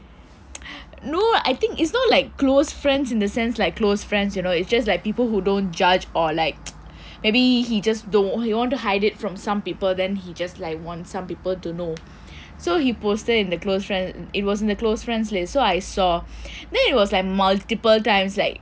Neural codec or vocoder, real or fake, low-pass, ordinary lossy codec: none; real; none; none